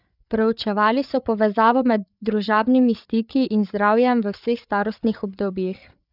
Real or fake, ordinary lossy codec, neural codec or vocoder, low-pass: fake; none; codec, 16 kHz, 8 kbps, FreqCodec, larger model; 5.4 kHz